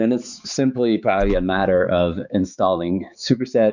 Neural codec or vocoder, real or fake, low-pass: codec, 16 kHz, 4 kbps, X-Codec, HuBERT features, trained on balanced general audio; fake; 7.2 kHz